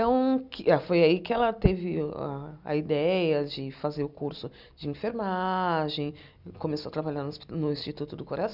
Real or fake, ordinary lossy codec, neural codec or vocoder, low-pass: real; none; none; 5.4 kHz